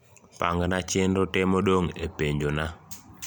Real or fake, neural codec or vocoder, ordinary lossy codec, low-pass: real; none; none; none